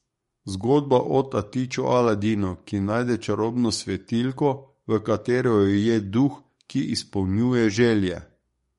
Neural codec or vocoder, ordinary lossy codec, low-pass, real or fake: codec, 44.1 kHz, 7.8 kbps, DAC; MP3, 48 kbps; 19.8 kHz; fake